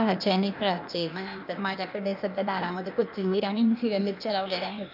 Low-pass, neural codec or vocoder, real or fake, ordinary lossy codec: 5.4 kHz; codec, 16 kHz, 0.8 kbps, ZipCodec; fake; none